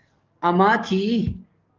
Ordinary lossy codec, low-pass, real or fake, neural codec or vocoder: Opus, 24 kbps; 7.2 kHz; fake; codec, 44.1 kHz, 7.8 kbps, DAC